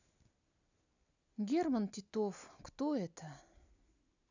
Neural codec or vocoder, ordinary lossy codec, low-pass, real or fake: none; none; 7.2 kHz; real